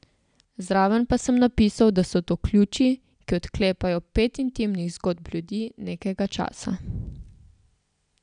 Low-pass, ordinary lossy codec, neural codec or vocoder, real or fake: 9.9 kHz; none; none; real